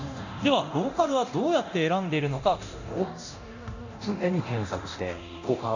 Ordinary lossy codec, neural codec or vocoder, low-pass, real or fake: none; codec, 24 kHz, 0.9 kbps, DualCodec; 7.2 kHz; fake